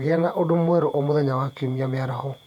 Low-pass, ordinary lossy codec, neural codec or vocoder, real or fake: 19.8 kHz; none; vocoder, 48 kHz, 128 mel bands, Vocos; fake